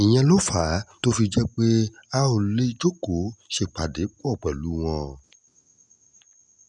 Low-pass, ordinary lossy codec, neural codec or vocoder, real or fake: 10.8 kHz; none; none; real